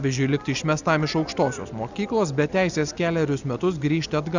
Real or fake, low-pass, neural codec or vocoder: real; 7.2 kHz; none